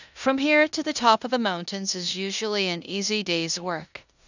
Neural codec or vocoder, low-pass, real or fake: codec, 16 kHz in and 24 kHz out, 0.9 kbps, LongCat-Audio-Codec, four codebook decoder; 7.2 kHz; fake